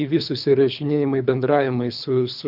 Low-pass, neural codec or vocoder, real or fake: 5.4 kHz; codec, 24 kHz, 3 kbps, HILCodec; fake